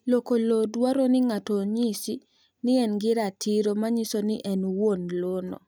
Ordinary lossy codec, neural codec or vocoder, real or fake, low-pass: none; none; real; none